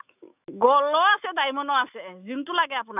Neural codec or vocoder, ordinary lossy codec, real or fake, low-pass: autoencoder, 48 kHz, 128 numbers a frame, DAC-VAE, trained on Japanese speech; none; fake; 3.6 kHz